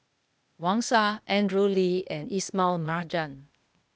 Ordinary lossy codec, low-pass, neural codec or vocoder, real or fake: none; none; codec, 16 kHz, 0.8 kbps, ZipCodec; fake